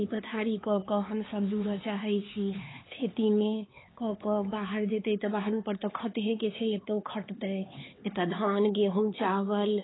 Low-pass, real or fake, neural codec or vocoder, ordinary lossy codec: 7.2 kHz; fake; codec, 16 kHz, 4 kbps, X-Codec, HuBERT features, trained on LibriSpeech; AAC, 16 kbps